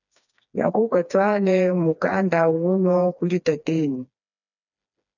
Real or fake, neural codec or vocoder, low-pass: fake; codec, 16 kHz, 2 kbps, FreqCodec, smaller model; 7.2 kHz